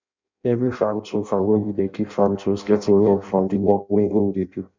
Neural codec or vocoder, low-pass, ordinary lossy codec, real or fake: codec, 16 kHz in and 24 kHz out, 0.6 kbps, FireRedTTS-2 codec; 7.2 kHz; MP3, 64 kbps; fake